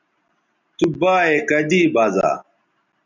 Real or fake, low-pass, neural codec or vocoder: real; 7.2 kHz; none